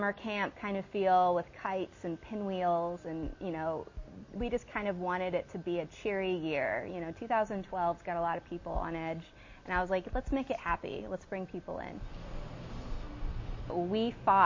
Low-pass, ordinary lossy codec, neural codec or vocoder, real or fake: 7.2 kHz; MP3, 32 kbps; none; real